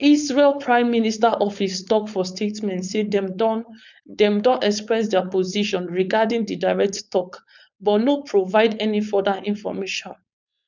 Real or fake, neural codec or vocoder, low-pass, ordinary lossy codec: fake; codec, 16 kHz, 4.8 kbps, FACodec; 7.2 kHz; none